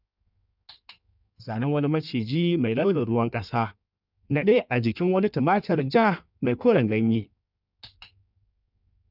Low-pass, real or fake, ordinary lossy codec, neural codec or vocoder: 5.4 kHz; fake; none; codec, 16 kHz in and 24 kHz out, 1.1 kbps, FireRedTTS-2 codec